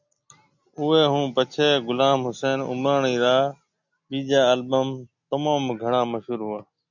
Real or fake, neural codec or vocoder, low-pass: real; none; 7.2 kHz